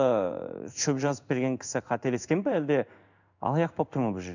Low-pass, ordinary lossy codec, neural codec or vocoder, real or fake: 7.2 kHz; none; codec, 16 kHz in and 24 kHz out, 1 kbps, XY-Tokenizer; fake